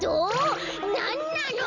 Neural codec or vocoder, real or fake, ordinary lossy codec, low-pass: none; real; none; 7.2 kHz